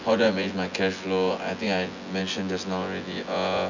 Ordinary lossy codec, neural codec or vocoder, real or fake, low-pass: none; vocoder, 24 kHz, 100 mel bands, Vocos; fake; 7.2 kHz